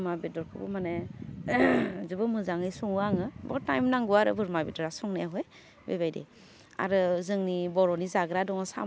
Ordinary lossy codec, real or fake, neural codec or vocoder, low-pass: none; real; none; none